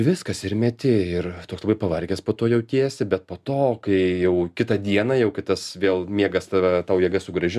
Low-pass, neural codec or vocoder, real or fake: 14.4 kHz; none; real